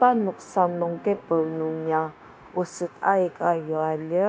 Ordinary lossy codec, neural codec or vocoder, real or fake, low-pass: none; codec, 16 kHz, 0.9 kbps, LongCat-Audio-Codec; fake; none